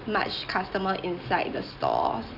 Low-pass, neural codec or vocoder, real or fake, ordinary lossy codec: 5.4 kHz; none; real; none